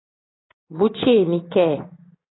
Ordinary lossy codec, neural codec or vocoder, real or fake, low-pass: AAC, 16 kbps; none; real; 7.2 kHz